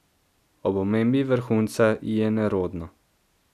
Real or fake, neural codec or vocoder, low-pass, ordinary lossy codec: real; none; 14.4 kHz; none